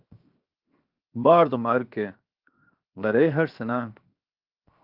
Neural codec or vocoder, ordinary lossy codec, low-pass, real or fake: codec, 16 kHz, 0.7 kbps, FocalCodec; Opus, 24 kbps; 5.4 kHz; fake